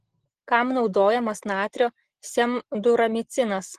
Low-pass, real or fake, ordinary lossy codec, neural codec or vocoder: 14.4 kHz; real; Opus, 16 kbps; none